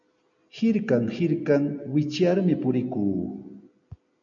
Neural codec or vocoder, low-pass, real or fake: none; 7.2 kHz; real